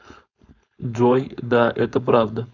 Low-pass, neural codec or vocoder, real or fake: 7.2 kHz; codec, 16 kHz, 4.8 kbps, FACodec; fake